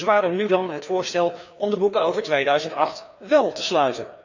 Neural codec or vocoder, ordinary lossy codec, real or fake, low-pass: codec, 16 kHz, 2 kbps, FreqCodec, larger model; none; fake; 7.2 kHz